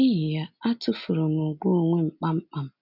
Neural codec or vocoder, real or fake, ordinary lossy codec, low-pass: none; real; none; 5.4 kHz